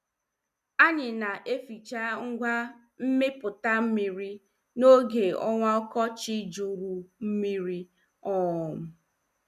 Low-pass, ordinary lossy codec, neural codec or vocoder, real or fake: 14.4 kHz; none; none; real